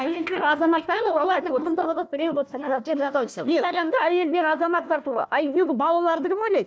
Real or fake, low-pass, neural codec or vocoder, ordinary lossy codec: fake; none; codec, 16 kHz, 1 kbps, FunCodec, trained on Chinese and English, 50 frames a second; none